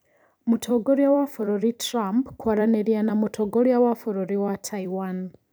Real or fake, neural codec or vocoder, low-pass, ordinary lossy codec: fake; vocoder, 44.1 kHz, 128 mel bands every 256 samples, BigVGAN v2; none; none